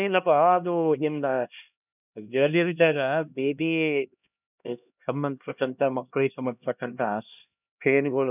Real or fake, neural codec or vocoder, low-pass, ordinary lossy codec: fake; codec, 16 kHz, 1 kbps, X-Codec, HuBERT features, trained on LibriSpeech; 3.6 kHz; none